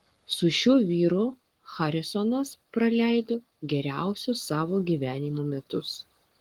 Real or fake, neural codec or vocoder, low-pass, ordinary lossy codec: fake; codec, 44.1 kHz, 7.8 kbps, Pupu-Codec; 19.8 kHz; Opus, 24 kbps